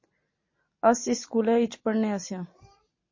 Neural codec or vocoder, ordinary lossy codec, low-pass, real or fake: none; MP3, 32 kbps; 7.2 kHz; real